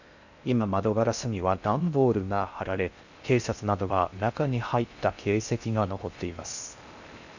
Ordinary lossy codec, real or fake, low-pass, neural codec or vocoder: none; fake; 7.2 kHz; codec, 16 kHz in and 24 kHz out, 0.6 kbps, FocalCodec, streaming, 4096 codes